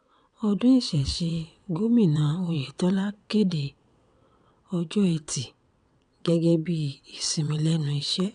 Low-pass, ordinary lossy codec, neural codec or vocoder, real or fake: 9.9 kHz; none; vocoder, 22.05 kHz, 80 mel bands, WaveNeXt; fake